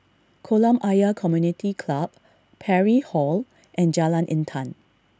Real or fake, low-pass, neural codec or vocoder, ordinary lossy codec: real; none; none; none